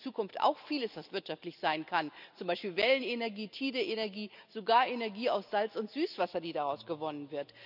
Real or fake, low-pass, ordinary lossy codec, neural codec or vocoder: real; 5.4 kHz; none; none